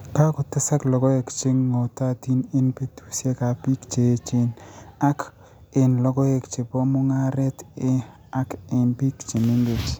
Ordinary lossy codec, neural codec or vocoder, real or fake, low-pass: none; none; real; none